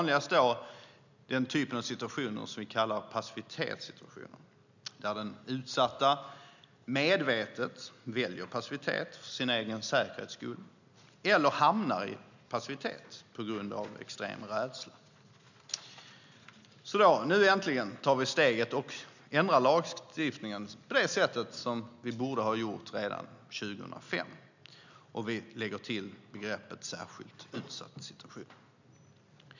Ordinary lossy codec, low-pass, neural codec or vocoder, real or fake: none; 7.2 kHz; none; real